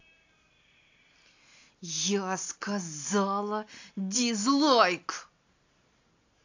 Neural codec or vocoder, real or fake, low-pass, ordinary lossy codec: none; real; 7.2 kHz; AAC, 48 kbps